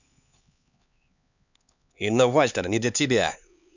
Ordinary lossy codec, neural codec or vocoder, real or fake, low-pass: none; codec, 16 kHz, 4 kbps, X-Codec, HuBERT features, trained on LibriSpeech; fake; 7.2 kHz